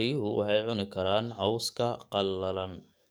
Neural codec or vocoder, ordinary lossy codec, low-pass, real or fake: codec, 44.1 kHz, 7.8 kbps, DAC; none; none; fake